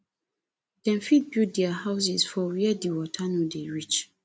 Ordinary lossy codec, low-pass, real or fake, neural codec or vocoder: none; none; real; none